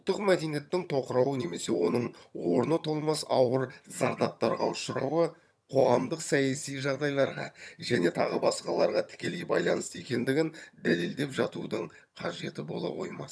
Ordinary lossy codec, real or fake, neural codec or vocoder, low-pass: none; fake; vocoder, 22.05 kHz, 80 mel bands, HiFi-GAN; none